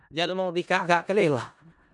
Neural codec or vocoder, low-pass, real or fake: codec, 16 kHz in and 24 kHz out, 0.4 kbps, LongCat-Audio-Codec, four codebook decoder; 10.8 kHz; fake